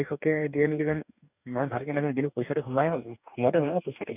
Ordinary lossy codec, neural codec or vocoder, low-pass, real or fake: none; codec, 44.1 kHz, 2.6 kbps, DAC; 3.6 kHz; fake